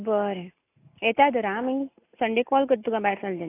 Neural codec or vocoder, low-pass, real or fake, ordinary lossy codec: none; 3.6 kHz; real; AAC, 24 kbps